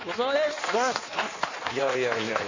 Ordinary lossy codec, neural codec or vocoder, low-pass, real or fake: Opus, 64 kbps; codec, 16 kHz in and 24 kHz out, 1.1 kbps, FireRedTTS-2 codec; 7.2 kHz; fake